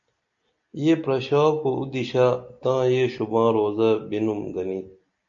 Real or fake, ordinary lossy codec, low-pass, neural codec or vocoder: real; MP3, 64 kbps; 7.2 kHz; none